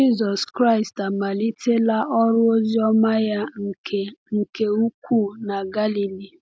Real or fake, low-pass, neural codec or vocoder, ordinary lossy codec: real; none; none; none